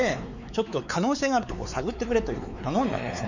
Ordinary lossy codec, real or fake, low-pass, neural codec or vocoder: none; fake; 7.2 kHz; codec, 16 kHz, 4 kbps, X-Codec, WavLM features, trained on Multilingual LibriSpeech